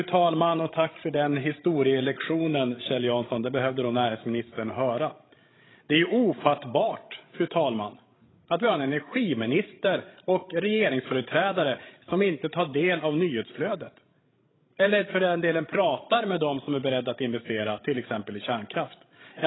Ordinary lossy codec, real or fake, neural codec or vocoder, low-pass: AAC, 16 kbps; fake; codec, 16 kHz, 16 kbps, FreqCodec, larger model; 7.2 kHz